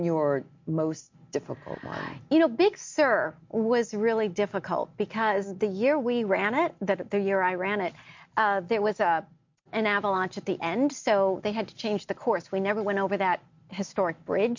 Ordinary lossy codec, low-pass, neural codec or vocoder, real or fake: MP3, 48 kbps; 7.2 kHz; none; real